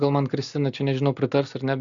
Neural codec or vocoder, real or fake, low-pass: none; real; 7.2 kHz